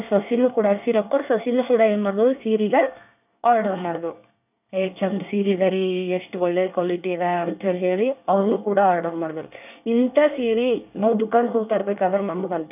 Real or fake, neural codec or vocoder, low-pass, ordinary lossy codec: fake; codec, 24 kHz, 1 kbps, SNAC; 3.6 kHz; none